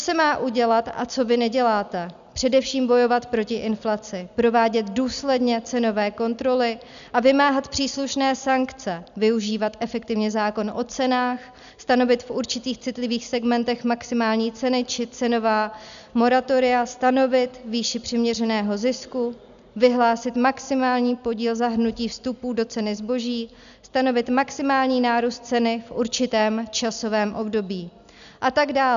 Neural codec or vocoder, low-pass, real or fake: none; 7.2 kHz; real